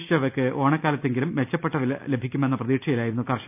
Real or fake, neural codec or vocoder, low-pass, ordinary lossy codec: real; none; 3.6 kHz; none